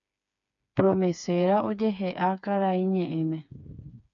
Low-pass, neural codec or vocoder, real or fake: 7.2 kHz; codec, 16 kHz, 4 kbps, FreqCodec, smaller model; fake